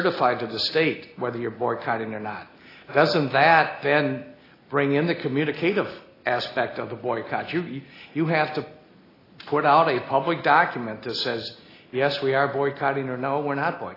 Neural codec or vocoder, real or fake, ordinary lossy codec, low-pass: none; real; AAC, 24 kbps; 5.4 kHz